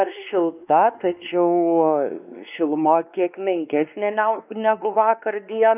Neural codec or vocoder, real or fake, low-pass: codec, 16 kHz, 2 kbps, X-Codec, WavLM features, trained on Multilingual LibriSpeech; fake; 3.6 kHz